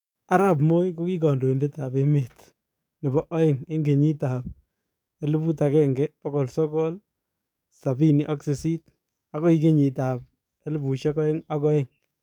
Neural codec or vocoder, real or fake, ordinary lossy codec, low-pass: codec, 44.1 kHz, 7.8 kbps, DAC; fake; none; 19.8 kHz